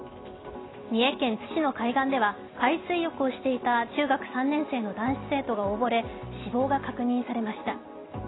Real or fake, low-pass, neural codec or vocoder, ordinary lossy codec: real; 7.2 kHz; none; AAC, 16 kbps